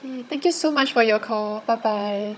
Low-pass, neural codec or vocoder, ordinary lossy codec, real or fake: none; codec, 16 kHz, 16 kbps, FunCodec, trained on Chinese and English, 50 frames a second; none; fake